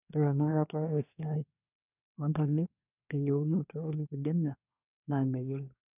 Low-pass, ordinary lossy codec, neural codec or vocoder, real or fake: 3.6 kHz; none; codec, 24 kHz, 1 kbps, SNAC; fake